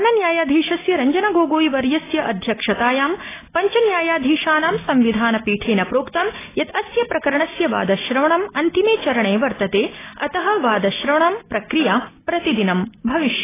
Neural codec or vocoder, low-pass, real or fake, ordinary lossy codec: none; 3.6 kHz; real; AAC, 16 kbps